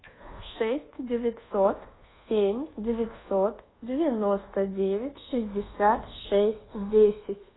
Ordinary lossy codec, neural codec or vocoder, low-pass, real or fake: AAC, 16 kbps; codec, 24 kHz, 1.2 kbps, DualCodec; 7.2 kHz; fake